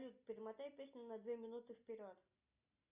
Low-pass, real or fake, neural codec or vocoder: 3.6 kHz; real; none